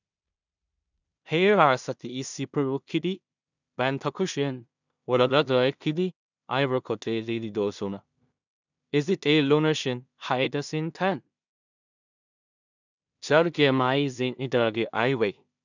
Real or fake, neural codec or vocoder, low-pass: fake; codec, 16 kHz in and 24 kHz out, 0.4 kbps, LongCat-Audio-Codec, two codebook decoder; 7.2 kHz